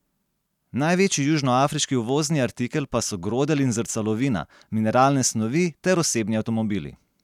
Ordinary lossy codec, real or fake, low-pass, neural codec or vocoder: none; real; 19.8 kHz; none